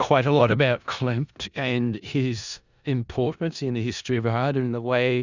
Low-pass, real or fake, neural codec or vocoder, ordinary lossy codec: 7.2 kHz; fake; codec, 16 kHz in and 24 kHz out, 0.4 kbps, LongCat-Audio-Codec, four codebook decoder; Opus, 64 kbps